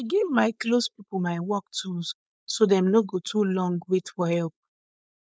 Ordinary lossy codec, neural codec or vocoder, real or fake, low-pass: none; codec, 16 kHz, 4.8 kbps, FACodec; fake; none